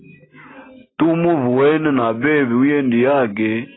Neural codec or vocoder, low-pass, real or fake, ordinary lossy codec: none; 7.2 kHz; real; AAC, 16 kbps